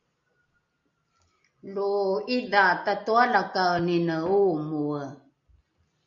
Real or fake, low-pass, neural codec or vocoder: real; 7.2 kHz; none